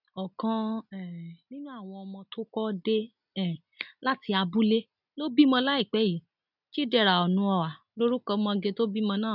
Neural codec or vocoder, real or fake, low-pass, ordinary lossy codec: none; real; 5.4 kHz; none